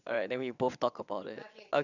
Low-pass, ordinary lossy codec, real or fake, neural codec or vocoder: 7.2 kHz; none; fake; vocoder, 22.05 kHz, 80 mel bands, WaveNeXt